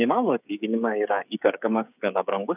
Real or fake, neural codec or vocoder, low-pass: fake; codec, 16 kHz, 8 kbps, FreqCodec, smaller model; 3.6 kHz